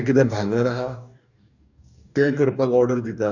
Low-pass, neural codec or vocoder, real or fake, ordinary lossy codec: 7.2 kHz; codec, 44.1 kHz, 2.6 kbps, DAC; fake; none